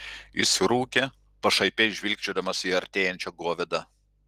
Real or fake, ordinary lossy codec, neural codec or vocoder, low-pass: real; Opus, 24 kbps; none; 14.4 kHz